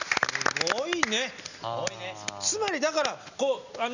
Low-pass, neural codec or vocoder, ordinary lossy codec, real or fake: 7.2 kHz; none; none; real